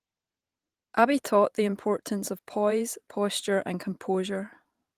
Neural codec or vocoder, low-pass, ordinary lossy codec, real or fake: vocoder, 44.1 kHz, 128 mel bands every 512 samples, BigVGAN v2; 14.4 kHz; Opus, 24 kbps; fake